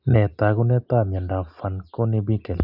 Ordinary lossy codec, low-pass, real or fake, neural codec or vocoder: none; 5.4 kHz; real; none